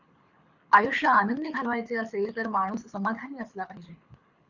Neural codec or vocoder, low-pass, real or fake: codec, 24 kHz, 6 kbps, HILCodec; 7.2 kHz; fake